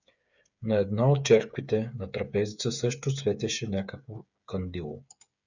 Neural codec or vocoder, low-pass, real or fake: codec, 16 kHz, 8 kbps, FreqCodec, smaller model; 7.2 kHz; fake